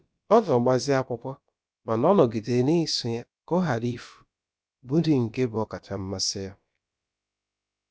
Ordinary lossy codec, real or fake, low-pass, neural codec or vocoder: none; fake; none; codec, 16 kHz, about 1 kbps, DyCAST, with the encoder's durations